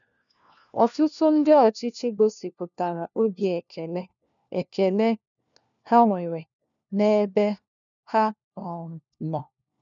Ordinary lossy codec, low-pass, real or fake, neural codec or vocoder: none; 7.2 kHz; fake; codec, 16 kHz, 1 kbps, FunCodec, trained on LibriTTS, 50 frames a second